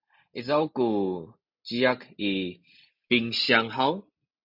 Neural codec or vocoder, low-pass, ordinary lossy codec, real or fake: none; 5.4 kHz; AAC, 48 kbps; real